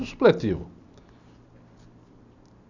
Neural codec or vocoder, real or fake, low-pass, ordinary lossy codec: none; real; 7.2 kHz; none